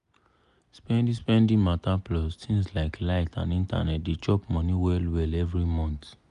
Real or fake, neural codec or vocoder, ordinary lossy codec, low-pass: real; none; none; 10.8 kHz